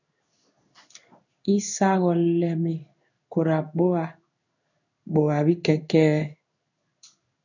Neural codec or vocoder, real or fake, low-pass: codec, 16 kHz in and 24 kHz out, 1 kbps, XY-Tokenizer; fake; 7.2 kHz